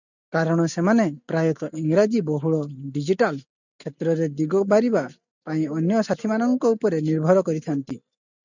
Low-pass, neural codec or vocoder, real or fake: 7.2 kHz; none; real